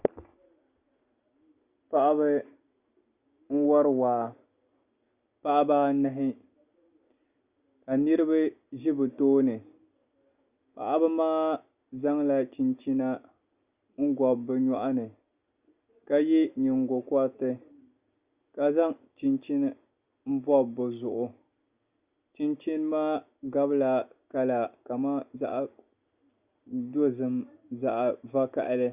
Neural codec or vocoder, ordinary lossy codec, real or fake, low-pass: none; Opus, 64 kbps; real; 3.6 kHz